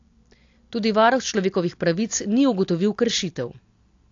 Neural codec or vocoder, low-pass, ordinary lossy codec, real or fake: none; 7.2 kHz; AAC, 48 kbps; real